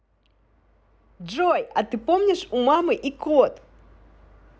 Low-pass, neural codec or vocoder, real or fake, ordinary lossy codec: none; none; real; none